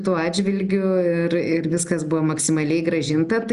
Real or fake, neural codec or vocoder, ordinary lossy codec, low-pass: real; none; Opus, 64 kbps; 10.8 kHz